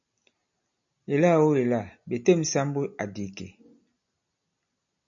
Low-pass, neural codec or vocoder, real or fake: 7.2 kHz; none; real